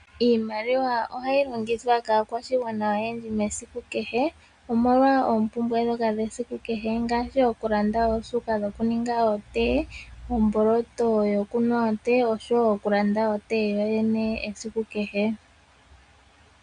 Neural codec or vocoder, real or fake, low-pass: none; real; 9.9 kHz